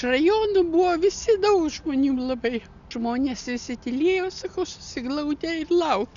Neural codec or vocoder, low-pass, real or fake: none; 7.2 kHz; real